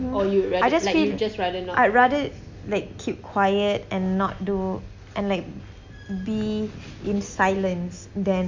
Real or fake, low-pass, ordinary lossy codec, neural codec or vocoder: real; 7.2 kHz; MP3, 48 kbps; none